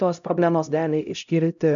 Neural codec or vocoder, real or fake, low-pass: codec, 16 kHz, 0.5 kbps, X-Codec, HuBERT features, trained on LibriSpeech; fake; 7.2 kHz